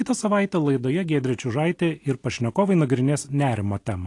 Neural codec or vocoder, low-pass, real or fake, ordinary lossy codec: none; 10.8 kHz; real; AAC, 48 kbps